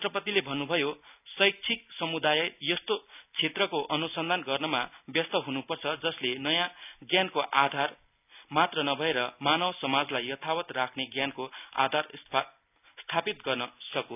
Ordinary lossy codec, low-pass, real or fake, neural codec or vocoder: none; 3.6 kHz; real; none